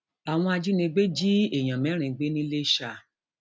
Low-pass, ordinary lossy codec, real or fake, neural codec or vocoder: none; none; real; none